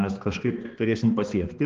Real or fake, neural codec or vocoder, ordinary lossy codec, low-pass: fake; codec, 16 kHz, 2 kbps, X-Codec, HuBERT features, trained on balanced general audio; Opus, 16 kbps; 7.2 kHz